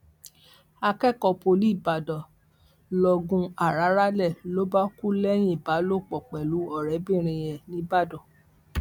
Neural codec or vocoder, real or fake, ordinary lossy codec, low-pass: none; real; none; 19.8 kHz